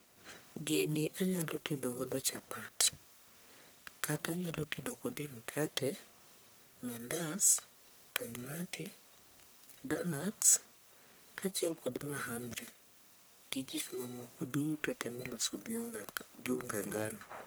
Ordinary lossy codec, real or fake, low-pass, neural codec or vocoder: none; fake; none; codec, 44.1 kHz, 1.7 kbps, Pupu-Codec